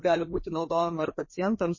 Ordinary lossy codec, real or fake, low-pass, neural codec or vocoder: MP3, 32 kbps; fake; 7.2 kHz; codec, 16 kHz, 2 kbps, FreqCodec, larger model